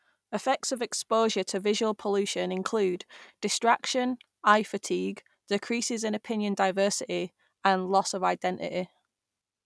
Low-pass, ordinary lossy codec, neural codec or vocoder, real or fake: none; none; none; real